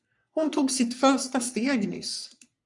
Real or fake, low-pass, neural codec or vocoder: fake; 10.8 kHz; codec, 44.1 kHz, 3.4 kbps, Pupu-Codec